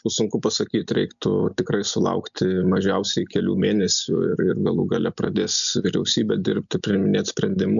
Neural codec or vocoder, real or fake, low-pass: none; real; 7.2 kHz